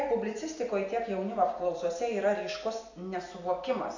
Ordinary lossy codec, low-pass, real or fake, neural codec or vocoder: AAC, 48 kbps; 7.2 kHz; real; none